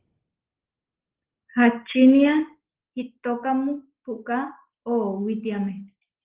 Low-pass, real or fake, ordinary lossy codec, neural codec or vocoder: 3.6 kHz; real; Opus, 16 kbps; none